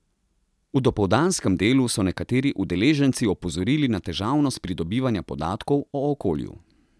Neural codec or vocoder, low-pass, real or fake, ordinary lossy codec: none; none; real; none